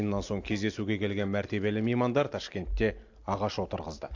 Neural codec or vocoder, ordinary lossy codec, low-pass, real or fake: none; AAC, 48 kbps; 7.2 kHz; real